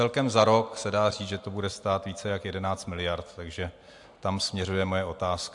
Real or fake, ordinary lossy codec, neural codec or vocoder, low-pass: real; AAC, 64 kbps; none; 10.8 kHz